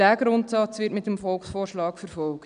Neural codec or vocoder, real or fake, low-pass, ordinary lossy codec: none; real; 9.9 kHz; none